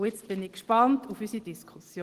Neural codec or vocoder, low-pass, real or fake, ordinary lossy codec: none; 14.4 kHz; real; Opus, 16 kbps